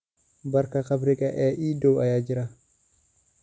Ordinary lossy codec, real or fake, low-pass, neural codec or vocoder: none; real; none; none